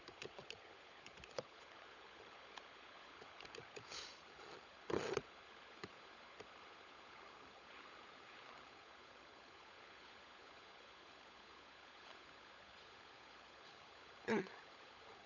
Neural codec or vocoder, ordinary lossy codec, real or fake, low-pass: codec, 16 kHz, 16 kbps, FunCodec, trained on LibriTTS, 50 frames a second; none; fake; 7.2 kHz